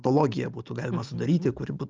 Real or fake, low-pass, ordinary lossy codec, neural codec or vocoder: real; 7.2 kHz; Opus, 32 kbps; none